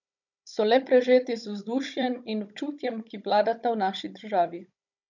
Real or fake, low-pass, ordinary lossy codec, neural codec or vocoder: fake; 7.2 kHz; none; codec, 16 kHz, 16 kbps, FunCodec, trained on Chinese and English, 50 frames a second